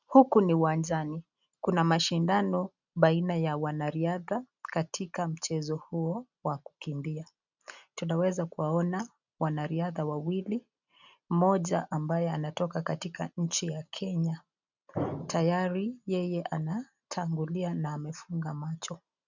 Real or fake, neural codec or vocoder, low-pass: real; none; 7.2 kHz